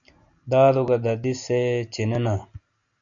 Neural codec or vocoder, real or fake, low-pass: none; real; 7.2 kHz